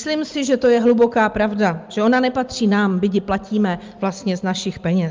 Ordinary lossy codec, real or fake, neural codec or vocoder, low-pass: Opus, 24 kbps; real; none; 7.2 kHz